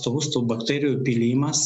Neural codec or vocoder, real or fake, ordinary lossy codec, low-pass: none; real; MP3, 96 kbps; 9.9 kHz